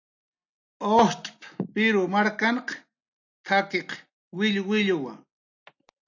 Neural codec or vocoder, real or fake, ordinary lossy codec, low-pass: none; real; AAC, 48 kbps; 7.2 kHz